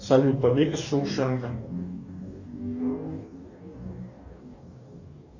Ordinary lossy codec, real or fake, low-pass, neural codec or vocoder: Opus, 64 kbps; fake; 7.2 kHz; codec, 44.1 kHz, 2.6 kbps, DAC